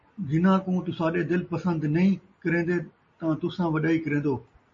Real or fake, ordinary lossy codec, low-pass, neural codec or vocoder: real; MP3, 32 kbps; 7.2 kHz; none